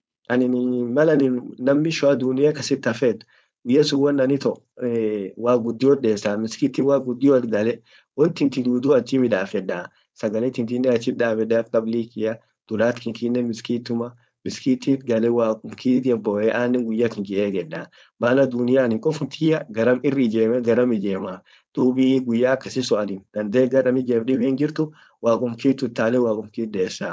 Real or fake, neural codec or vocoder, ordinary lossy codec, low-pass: fake; codec, 16 kHz, 4.8 kbps, FACodec; none; none